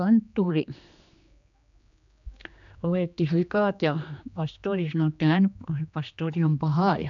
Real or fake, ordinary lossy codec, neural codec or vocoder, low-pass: fake; none; codec, 16 kHz, 2 kbps, X-Codec, HuBERT features, trained on general audio; 7.2 kHz